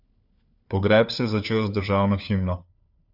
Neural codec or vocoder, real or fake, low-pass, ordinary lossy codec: codec, 16 kHz, 4 kbps, FunCodec, trained on LibriTTS, 50 frames a second; fake; 5.4 kHz; AAC, 48 kbps